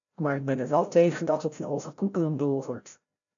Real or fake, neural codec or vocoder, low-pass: fake; codec, 16 kHz, 0.5 kbps, FreqCodec, larger model; 7.2 kHz